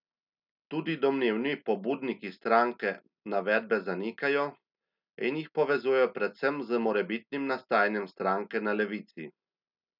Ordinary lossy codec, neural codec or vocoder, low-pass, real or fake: none; none; 5.4 kHz; real